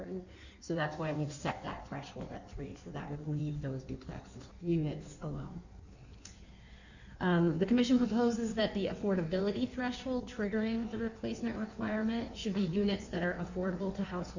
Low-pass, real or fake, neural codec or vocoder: 7.2 kHz; fake; codec, 16 kHz in and 24 kHz out, 1.1 kbps, FireRedTTS-2 codec